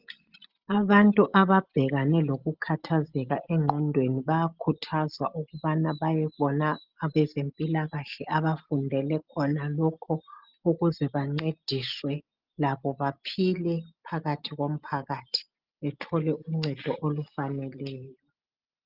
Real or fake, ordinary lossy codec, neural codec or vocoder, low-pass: real; Opus, 24 kbps; none; 5.4 kHz